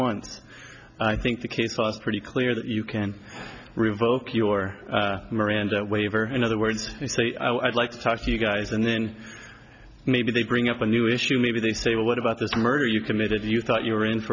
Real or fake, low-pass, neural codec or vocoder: real; 7.2 kHz; none